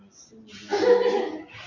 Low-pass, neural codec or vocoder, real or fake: 7.2 kHz; none; real